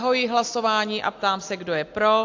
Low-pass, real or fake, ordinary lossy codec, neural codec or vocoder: 7.2 kHz; real; AAC, 48 kbps; none